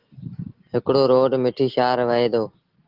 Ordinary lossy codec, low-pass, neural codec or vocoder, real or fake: Opus, 16 kbps; 5.4 kHz; none; real